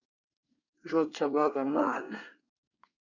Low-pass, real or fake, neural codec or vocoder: 7.2 kHz; fake; codec, 24 kHz, 1 kbps, SNAC